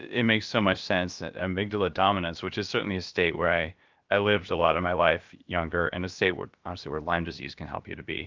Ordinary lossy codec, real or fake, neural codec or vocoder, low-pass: Opus, 24 kbps; fake; codec, 16 kHz, about 1 kbps, DyCAST, with the encoder's durations; 7.2 kHz